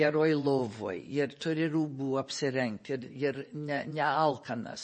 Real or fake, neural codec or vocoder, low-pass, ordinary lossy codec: fake; vocoder, 24 kHz, 100 mel bands, Vocos; 10.8 kHz; MP3, 32 kbps